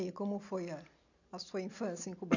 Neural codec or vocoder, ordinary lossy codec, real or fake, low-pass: none; none; real; 7.2 kHz